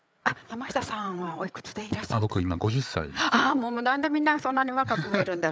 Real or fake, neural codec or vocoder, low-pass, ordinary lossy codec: fake; codec, 16 kHz, 8 kbps, FreqCodec, larger model; none; none